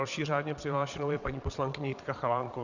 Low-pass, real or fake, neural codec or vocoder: 7.2 kHz; fake; vocoder, 22.05 kHz, 80 mel bands, Vocos